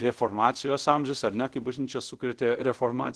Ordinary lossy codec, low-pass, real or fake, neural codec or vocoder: Opus, 16 kbps; 10.8 kHz; fake; codec, 24 kHz, 0.5 kbps, DualCodec